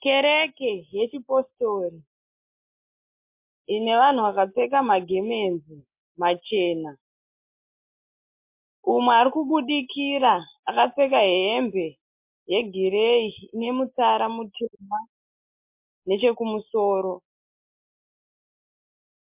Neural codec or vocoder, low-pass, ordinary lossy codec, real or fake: none; 3.6 kHz; MP3, 32 kbps; real